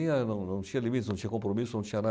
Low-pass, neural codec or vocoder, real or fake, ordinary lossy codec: none; none; real; none